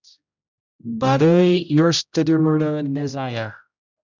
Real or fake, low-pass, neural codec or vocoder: fake; 7.2 kHz; codec, 16 kHz, 0.5 kbps, X-Codec, HuBERT features, trained on general audio